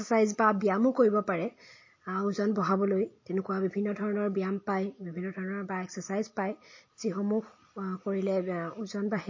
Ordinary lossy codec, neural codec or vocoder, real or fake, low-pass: MP3, 32 kbps; none; real; 7.2 kHz